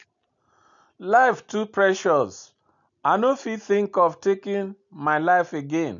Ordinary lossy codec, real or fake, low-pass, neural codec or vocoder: none; real; 7.2 kHz; none